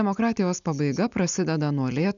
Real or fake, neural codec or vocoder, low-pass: real; none; 7.2 kHz